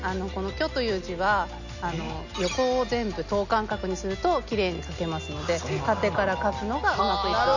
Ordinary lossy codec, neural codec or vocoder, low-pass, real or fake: none; none; 7.2 kHz; real